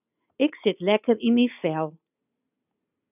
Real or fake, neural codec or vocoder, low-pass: real; none; 3.6 kHz